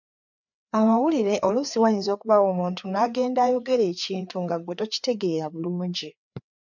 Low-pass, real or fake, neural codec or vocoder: 7.2 kHz; fake; codec, 16 kHz, 4 kbps, FreqCodec, larger model